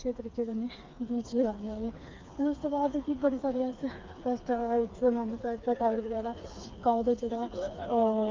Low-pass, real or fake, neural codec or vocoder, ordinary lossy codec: 7.2 kHz; fake; codec, 16 kHz, 4 kbps, FreqCodec, smaller model; Opus, 24 kbps